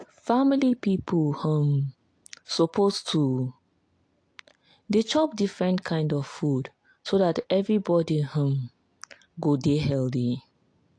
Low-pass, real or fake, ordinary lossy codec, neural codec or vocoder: 9.9 kHz; real; AAC, 48 kbps; none